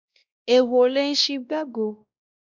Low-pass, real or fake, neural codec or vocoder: 7.2 kHz; fake; codec, 16 kHz, 1 kbps, X-Codec, WavLM features, trained on Multilingual LibriSpeech